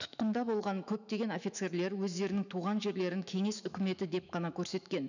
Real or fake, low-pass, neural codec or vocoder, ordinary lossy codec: fake; 7.2 kHz; autoencoder, 48 kHz, 128 numbers a frame, DAC-VAE, trained on Japanese speech; none